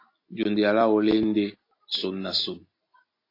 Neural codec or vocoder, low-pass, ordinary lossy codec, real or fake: none; 5.4 kHz; AAC, 32 kbps; real